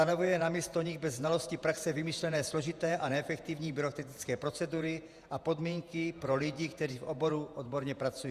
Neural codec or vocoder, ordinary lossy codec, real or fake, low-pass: vocoder, 48 kHz, 128 mel bands, Vocos; Opus, 64 kbps; fake; 14.4 kHz